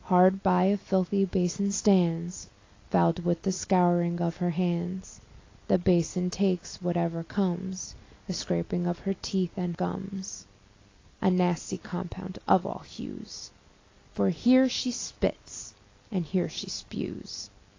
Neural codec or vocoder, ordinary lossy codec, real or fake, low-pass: none; AAC, 32 kbps; real; 7.2 kHz